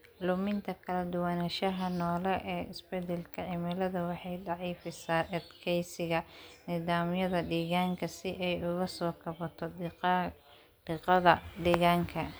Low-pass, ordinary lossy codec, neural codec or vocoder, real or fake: none; none; none; real